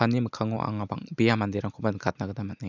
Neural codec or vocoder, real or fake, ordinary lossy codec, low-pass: vocoder, 44.1 kHz, 128 mel bands every 256 samples, BigVGAN v2; fake; none; 7.2 kHz